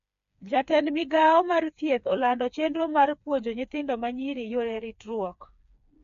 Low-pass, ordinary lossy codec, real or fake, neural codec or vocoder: 7.2 kHz; AAC, 64 kbps; fake; codec, 16 kHz, 4 kbps, FreqCodec, smaller model